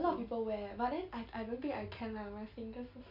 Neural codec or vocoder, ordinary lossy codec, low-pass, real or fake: none; none; 5.4 kHz; real